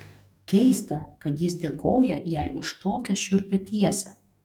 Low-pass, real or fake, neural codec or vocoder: 19.8 kHz; fake; codec, 44.1 kHz, 2.6 kbps, DAC